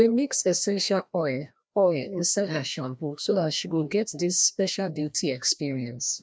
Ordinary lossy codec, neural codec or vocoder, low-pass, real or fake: none; codec, 16 kHz, 1 kbps, FreqCodec, larger model; none; fake